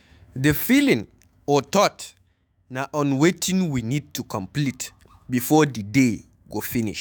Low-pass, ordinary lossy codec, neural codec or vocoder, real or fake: none; none; autoencoder, 48 kHz, 128 numbers a frame, DAC-VAE, trained on Japanese speech; fake